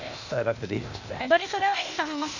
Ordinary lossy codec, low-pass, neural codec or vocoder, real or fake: none; 7.2 kHz; codec, 16 kHz, 0.8 kbps, ZipCodec; fake